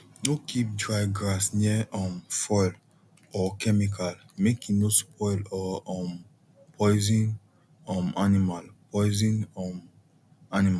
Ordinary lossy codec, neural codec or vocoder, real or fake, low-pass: none; none; real; none